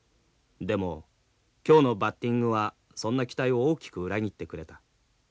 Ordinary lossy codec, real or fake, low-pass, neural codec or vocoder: none; real; none; none